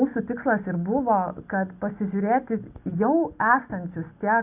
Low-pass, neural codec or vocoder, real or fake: 3.6 kHz; none; real